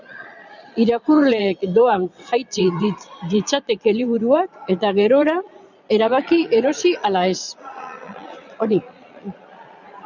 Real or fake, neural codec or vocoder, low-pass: fake; vocoder, 22.05 kHz, 80 mel bands, Vocos; 7.2 kHz